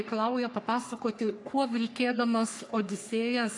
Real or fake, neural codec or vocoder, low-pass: fake; codec, 44.1 kHz, 3.4 kbps, Pupu-Codec; 10.8 kHz